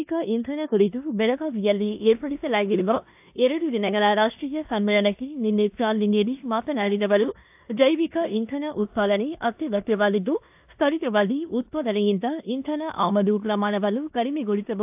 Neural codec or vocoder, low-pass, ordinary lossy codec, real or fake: codec, 16 kHz in and 24 kHz out, 0.9 kbps, LongCat-Audio-Codec, four codebook decoder; 3.6 kHz; AAC, 32 kbps; fake